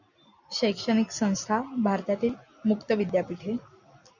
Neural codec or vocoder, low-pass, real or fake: none; 7.2 kHz; real